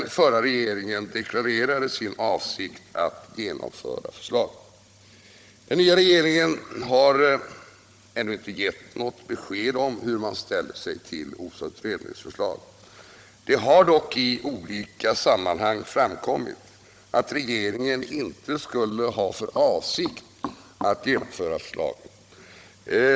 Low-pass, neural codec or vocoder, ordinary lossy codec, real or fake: none; codec, 16 kHz, 16 kbps, FunCodec, trained on Chinese and English, 50 frames a second; none; fake